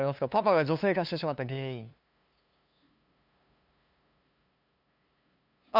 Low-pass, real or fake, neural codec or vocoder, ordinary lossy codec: 5.4 kHz; fake; codec, 16 kHz, 2 kbps, FunCodec, trained on LibriTTS, 25 frames a second; none